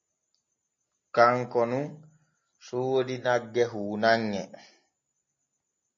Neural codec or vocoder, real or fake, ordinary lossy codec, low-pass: none; real; MP3, 32 kbps; 7.2 kHz